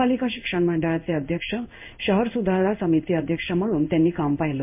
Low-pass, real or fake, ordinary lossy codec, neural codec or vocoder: 3.6 kHz; fake; MP3, 32 kbps; codec, 16 kHz in and 24 kHz out, 1 kbps, XY-Tokenizer